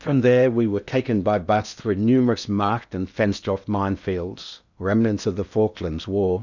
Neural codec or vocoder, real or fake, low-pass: codec, 16 kHz in and 24 kHz out, 0.8 kbps, FocalCodec, streaming, 65536 codes; fake; 7.2 kHz